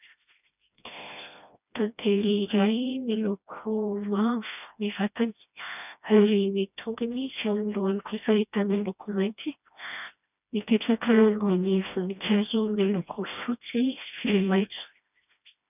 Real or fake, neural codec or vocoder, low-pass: fake; codec, 16 kHz, 1 kbps, FreqCodec, smaller model; 3.6 kHz